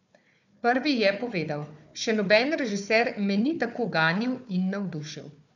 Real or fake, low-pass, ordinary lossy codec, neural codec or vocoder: fake; 7.2 kHz; none; codec, 16 kHz, 4 kbps, FunCodec, trained on Chinese and English, 50 frames a second